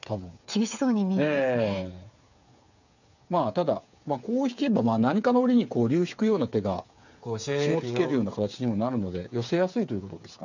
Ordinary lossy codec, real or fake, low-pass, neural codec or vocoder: none; fake; 7.2 kHz; codec, 16 kHz, 8 kbps, FreqCodec, smaller model